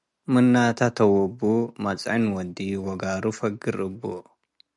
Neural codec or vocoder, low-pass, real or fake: none; 10.8 kHz; real